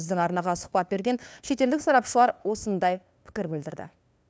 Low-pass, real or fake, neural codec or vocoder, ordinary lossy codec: none; fake; codec, 16 kHz, 2 kbps, FunCodec, trained on LibriTTS, 25 frames a second; none